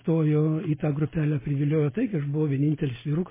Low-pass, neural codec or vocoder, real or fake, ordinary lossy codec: 3.6 kHz; none; real; MP3, 16 kbps